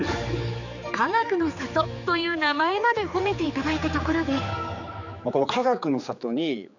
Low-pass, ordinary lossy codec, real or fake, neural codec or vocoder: 7.2 kHz; none; fake; codec, 16 kHz, 4 kbps, X-Codec, HuBERT features, trained on general audio